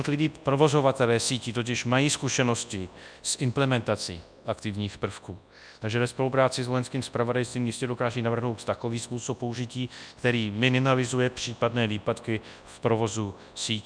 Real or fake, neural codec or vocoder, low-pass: fake; codec, 24 kHz, 0.9 kbps, WavTokenizer, large speech release; 9.9 kHz